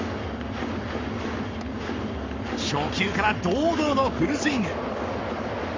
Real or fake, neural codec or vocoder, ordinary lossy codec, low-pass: fake; codec, 44.1 kHz, 7.8 kbps, Pupu-Codec; MP3, 64 kbps; 7.2 kHz